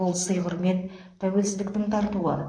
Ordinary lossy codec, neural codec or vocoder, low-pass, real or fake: AAC, 64 kbps; vocoder, 44.1 kHz, 128 mel bands, Pupu-Vocoder; 9.9 kHz; fake